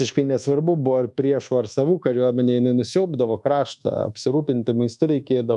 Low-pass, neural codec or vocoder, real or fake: 10.8 kHz; codec, 24 kHz, 1.2 kbps, DualCodec; fake